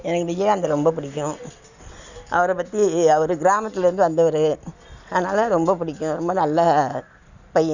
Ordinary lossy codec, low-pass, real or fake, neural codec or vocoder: none; 7.2 kHz; real; none